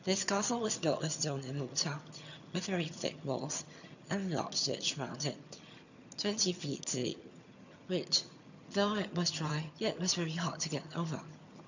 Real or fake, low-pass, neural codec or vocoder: fake; 7.2 kHz; vocoder, 22.05 kHz, 80 mel bands, HiFi-GAN